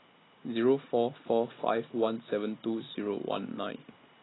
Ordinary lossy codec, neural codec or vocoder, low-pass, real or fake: AAC, 16 kbps; none; 7.2 kHz; real